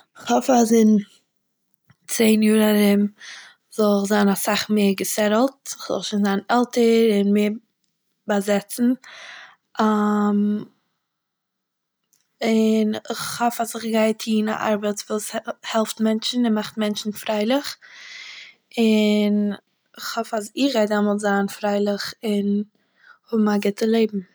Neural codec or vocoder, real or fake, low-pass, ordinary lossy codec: none; real; none; none